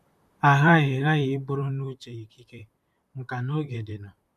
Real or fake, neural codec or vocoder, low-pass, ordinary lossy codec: fake; vocoder, 44.1 kHz, 128 mel bands, Pupu-Vocoder; 14.4 kHz; none